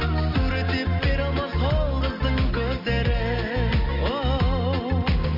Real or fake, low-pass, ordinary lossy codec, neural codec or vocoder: real; 5.4 kHz; MP3, 48 kbps; none